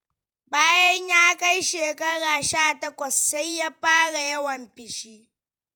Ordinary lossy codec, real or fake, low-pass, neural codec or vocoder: none; fake; none; vocoder, 48 kHz, 128 mel bands, Vocos